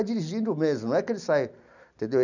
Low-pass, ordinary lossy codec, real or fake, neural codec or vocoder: 7.2 kHz; none; real; none